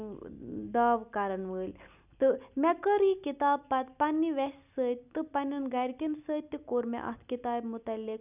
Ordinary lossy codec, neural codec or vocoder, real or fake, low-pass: none; none; real; 3.6 kHz